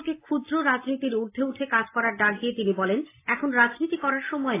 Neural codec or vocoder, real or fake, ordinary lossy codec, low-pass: none; real; AAC, 24 kbps; 3.6 kHz